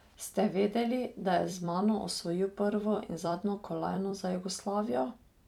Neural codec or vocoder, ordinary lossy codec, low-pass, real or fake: vocoder, 44.1 kHz, 128 mel bands every 256 samples, BigVGAN v2; none; 19.8 kHz; fake